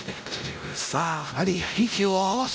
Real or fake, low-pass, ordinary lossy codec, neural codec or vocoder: fake; none; none; codec, 16 kHz, 0.5 kbps, X-Codec, WavLM features, trained on Multilingual LibriSpeech